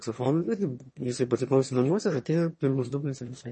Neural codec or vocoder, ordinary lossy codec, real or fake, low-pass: autoencoder, 22.05 kHz, a latent of 192 numbers a frame, VITS, trained on one speaker; MP3, 32 kbps; fake; 9.9 kHz